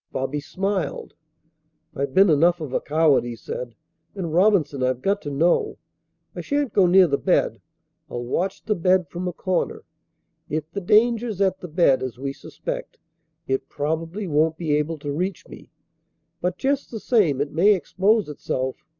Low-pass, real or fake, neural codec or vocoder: 7.2 kHz; real; none